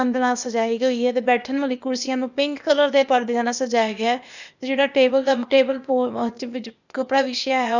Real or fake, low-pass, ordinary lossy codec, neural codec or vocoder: fake; 7.2 kHz; none; codec, 16 kHz, 0.8 kbps, ZipCodec